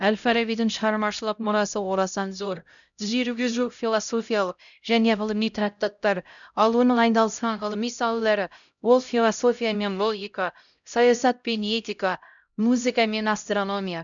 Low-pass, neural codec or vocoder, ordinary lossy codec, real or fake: 7.2 kHz; codec, 16 kHz, 0.5 kbps, X-Codec, HuBERT features, trained on LibriSpeech; none; fake